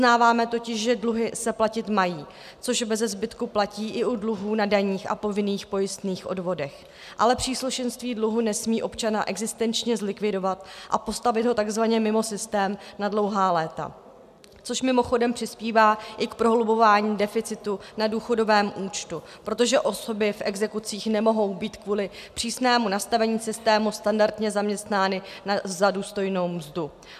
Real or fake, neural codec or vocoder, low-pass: real; none; 14.4 kHz